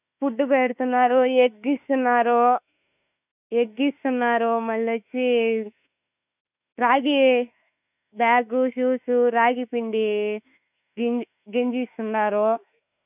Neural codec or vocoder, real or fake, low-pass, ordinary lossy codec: autoencoder, 48 kHz, 32 numbers a frame, DAC-VAE, trained on Japanese speech; fake; 3.6 kHz; none